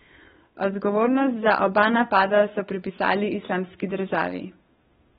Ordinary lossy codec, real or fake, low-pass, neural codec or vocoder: AAC, 16 kbps; fake; 7.2 kHz; codec, 16 kHz, 8 kbps, FunCodec, trained on Chinese and English, 25 frames a second